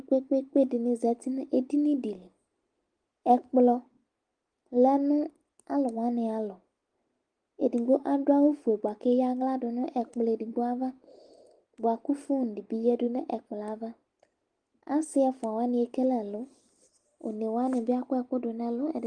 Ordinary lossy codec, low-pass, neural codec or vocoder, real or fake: Opus, 24 kbps; 9.9 kHz; none; real